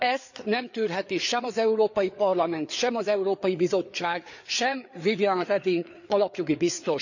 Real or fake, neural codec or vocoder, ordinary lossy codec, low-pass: fake; codec, 16 kHz, 4 kbps, FreqCodec, larger model; none; 7.2 kHz